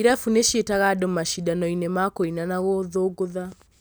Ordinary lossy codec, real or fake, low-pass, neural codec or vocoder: none; real; none; none